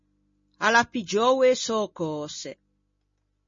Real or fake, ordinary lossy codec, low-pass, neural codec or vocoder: real; MP3, 32 kbps; 7.2 kHz; none